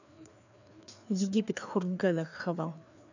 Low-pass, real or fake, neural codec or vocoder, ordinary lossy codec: 7.2 kHz; fake; codec, 16 kHz, 2 kbps, FreqCodec, larger model; none